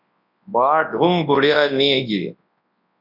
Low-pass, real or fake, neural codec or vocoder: 5.4 kHz; fake; codec, 24 kHz, 0.9 kbps, WavTokenizer, large speech release